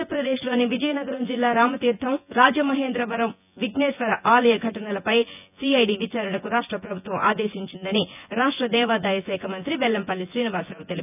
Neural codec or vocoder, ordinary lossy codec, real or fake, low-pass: vocoder, 24 kHz, 100 mel bands, Vocos; none; fake; 3.6 kHz